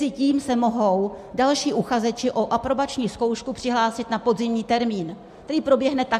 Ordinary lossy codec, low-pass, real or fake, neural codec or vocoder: MP3, 64 kbps; 14.4 kHz; real; none